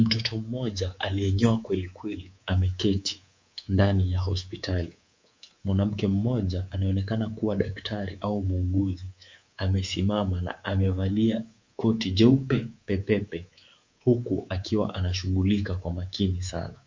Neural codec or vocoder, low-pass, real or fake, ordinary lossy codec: codec, 24 kHz, 3.1 kbps, DualCodec; 7.2 kHz; fake; MP3, 48 kbps